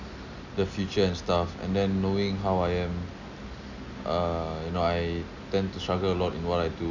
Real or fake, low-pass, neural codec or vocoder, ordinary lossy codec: real; 7.2 kHz; none; none